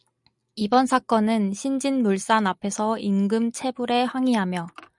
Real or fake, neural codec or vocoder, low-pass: real; none; 10.8 kHz